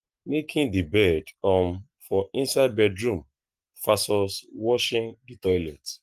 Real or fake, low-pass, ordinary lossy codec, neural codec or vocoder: fake; 14.4 kHz; Opus, 32 kbps; codec, 44.1 kHz, 7.8 kbps, Pupu-Codec